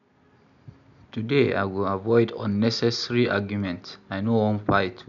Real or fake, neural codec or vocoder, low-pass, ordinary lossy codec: real; none; 7.2 kHz; none